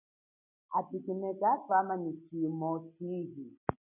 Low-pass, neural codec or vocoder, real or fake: 3.6 kHz; none; real